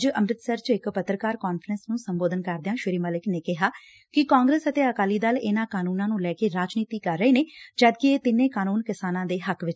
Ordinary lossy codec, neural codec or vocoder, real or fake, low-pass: none; none; real; none